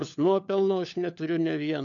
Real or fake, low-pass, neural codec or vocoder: fake; 7.2 kHz; codec, 16 kHz, 4 kbps, FunCodec, trained on LibriTTS, 50 frames a second